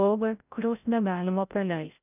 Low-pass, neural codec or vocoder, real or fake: 3.6 kHz; codec, 16 kHz, 0.5 kbps, FreqCodec, larger model; fake